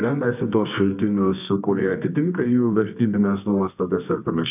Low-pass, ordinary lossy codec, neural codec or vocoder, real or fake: 3.6 kHz; AAC, 32 kbps; codec, 24 kHz, 0.9 kbps, WavTokenizer, medium music audio release; fake